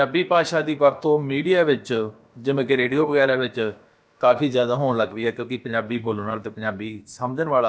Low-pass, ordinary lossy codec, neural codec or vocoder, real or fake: none; none; codec, 16 kHz, about 1 kbps, DyCAST, with the encoder's durations; fake